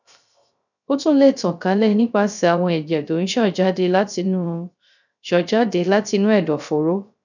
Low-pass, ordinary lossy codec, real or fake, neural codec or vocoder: 7.2 kHz; none; fake; codec, 16 kHz, 0.3 kbps, FocalCodec